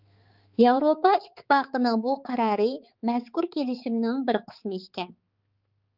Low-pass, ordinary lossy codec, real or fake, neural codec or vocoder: 5.4 kHz; Opus, 32 kbps; fake; codec, 16 kHz, 4 kbps, X-Codec, HuBERT features, trained on balanced general audio